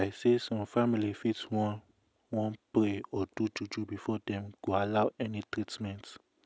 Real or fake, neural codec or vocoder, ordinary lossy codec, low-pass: real; none; none; none